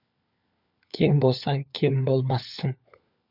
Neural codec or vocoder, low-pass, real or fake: codec, 16 kHz, 4 kbps, FunCodec, trained on LibriTTS, 50 frames a second; 5.4 kHz; fake